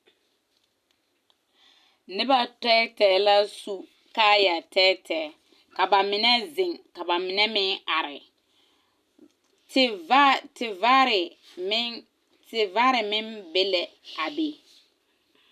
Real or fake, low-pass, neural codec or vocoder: real; 14.4 kHz; none